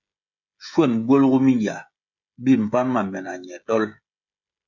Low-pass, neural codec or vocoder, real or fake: 7.2 kHz; codec, 16 kHz, 16 kbps, FreqCodec, smaller model; fake